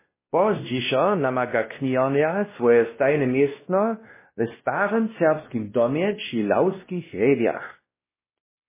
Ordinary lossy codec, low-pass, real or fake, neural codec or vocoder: MP3, 16 kbps; 3.6 kHz; fake; codec, 16 kHz, 1 kbps, X-Codec, WavLM features, trained on Multilingual LibriSpeech